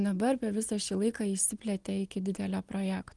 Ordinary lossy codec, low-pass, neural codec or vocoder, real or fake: Opus, 24 kbps; 10.8 kHz; none; real